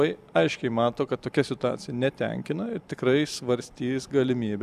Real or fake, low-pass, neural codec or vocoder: fake; 14.4 kHz; vocoder, 44.1 kHz, 128 mel bands every 256 samples, BigVGAN v2